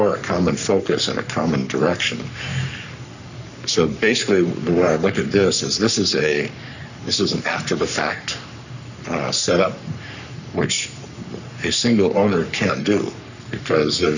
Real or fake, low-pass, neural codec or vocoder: fake; 7.2 kHz; codec, 44.1 kHz, 3.4 kbps, Pupu-Codec